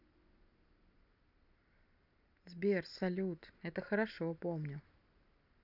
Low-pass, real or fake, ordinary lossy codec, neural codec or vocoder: 5.4 kHz; real; none; none